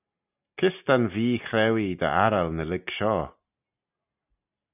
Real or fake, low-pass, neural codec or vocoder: real; 3.6 kHz; none